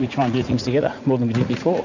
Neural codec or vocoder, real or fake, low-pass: vocoder, 44.1 kHz, 80 mel bands, Vocos; fake; 7.2 kHz